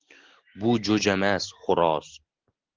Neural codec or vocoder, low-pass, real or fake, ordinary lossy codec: none; 7.2 kHz; real; Opus, 16 kbps